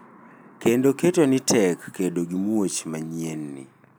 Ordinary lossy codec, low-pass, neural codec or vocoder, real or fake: none; none; none; real